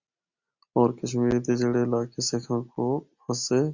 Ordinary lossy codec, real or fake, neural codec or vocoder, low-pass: Opus, 64 kbps; real; none; 7.2 kHz